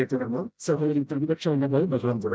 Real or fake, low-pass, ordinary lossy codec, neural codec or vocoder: fake; none; none; codec, 16 kHz, 0.5 kbps, FreqCodec, smaller model